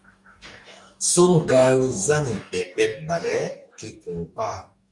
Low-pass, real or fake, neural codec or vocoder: 10.8 kHz; fake; codec, 44.1 kHz, 2.6 kbps, DAC